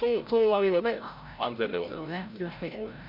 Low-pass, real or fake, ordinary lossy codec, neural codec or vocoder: 5.4 kHz; fake; MP3, 48 kbps; codec, 16 kHz, 0.5 kbps, FreqCodec, larger model